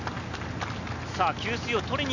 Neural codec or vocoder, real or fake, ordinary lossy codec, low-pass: none; real; none; 7.2 kHz